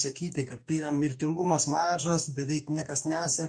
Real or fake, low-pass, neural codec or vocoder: fake; 9.9 kHz; codec, 44.1 kHz, 2.6 kbps, DAC